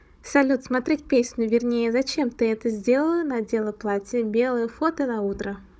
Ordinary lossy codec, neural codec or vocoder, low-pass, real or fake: none; codec, 16 kHz, 16 kbps, FunCodec, trained on Chinese and English, 50 frames a second; none; fake